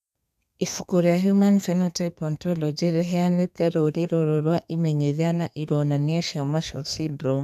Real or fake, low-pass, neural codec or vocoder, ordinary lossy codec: fake; 14.4 kHz; codec, 32 kHz, 1.9 kbps, SNAC; none